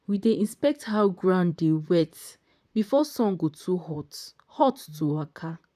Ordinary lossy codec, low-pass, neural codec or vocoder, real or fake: none; 14.4 kHz; vocoder, 44.1 kHz, 128 mel bands, Pupu-Vocoder; fake